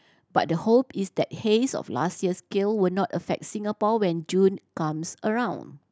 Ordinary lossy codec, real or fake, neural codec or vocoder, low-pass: none; real; none; none